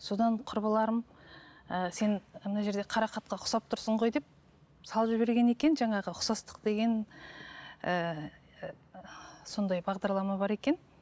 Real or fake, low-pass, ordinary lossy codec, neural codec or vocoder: real; none; none; none